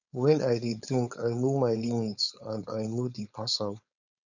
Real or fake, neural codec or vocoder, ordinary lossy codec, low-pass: fake; codec, 16 kHz, 4.8 kbps, FACodec; none; 7.2 kHz